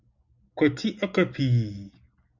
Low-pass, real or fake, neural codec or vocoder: 7.2 kHz; real; none